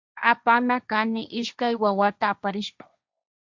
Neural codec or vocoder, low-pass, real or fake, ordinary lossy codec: codec, 16 kHz, 1.1 kbps, Voila-Tokenizer; 7.2 kHz; fake; Opus, 64 kbps